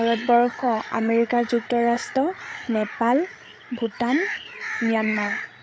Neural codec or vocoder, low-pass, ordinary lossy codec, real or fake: codec, 16 kHz, 8 kbps, FreqCodec, larger model; none; none; fake